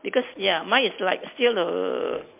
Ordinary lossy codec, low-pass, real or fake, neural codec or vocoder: MP3, 32 kbps; 3.6 kHz; real; none